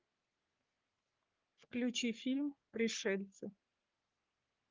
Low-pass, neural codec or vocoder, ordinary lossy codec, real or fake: 7.2 kHz; codec, 44.1 kHz, 3.4 kbps, Pupu-Codec; Opus, 32 kbps; fake